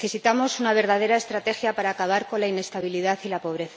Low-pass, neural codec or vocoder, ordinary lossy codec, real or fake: none; none; none; real